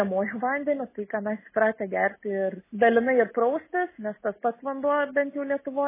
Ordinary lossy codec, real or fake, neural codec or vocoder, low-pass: MP3, 16 kbps; real; none; 3.6 kHz